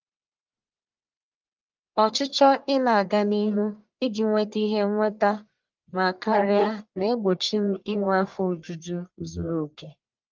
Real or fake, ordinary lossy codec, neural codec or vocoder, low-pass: fake; Opus, 24 kbps; codec, 44.1 kHz, 1.7 kbps, Pupu-Codec; 7.2 kHz